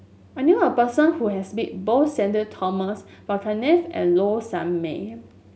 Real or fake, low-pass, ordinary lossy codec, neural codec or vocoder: real; none; none; none